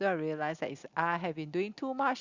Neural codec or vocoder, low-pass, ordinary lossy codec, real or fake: none; 7.2 kHz; Opus, 64 kbps; real